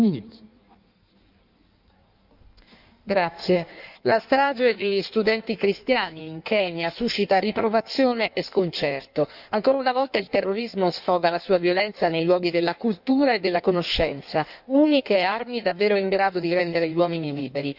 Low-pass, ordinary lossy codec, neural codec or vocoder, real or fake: 5.4 kHz; none; codec, 16 kHz in and 24 kHz out, 1.1 kbps, FireRedTTS-2 codec; fake